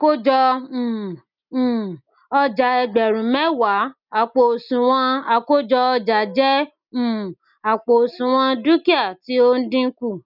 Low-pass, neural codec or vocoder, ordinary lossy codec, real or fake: 5.4 kHz; none; none; real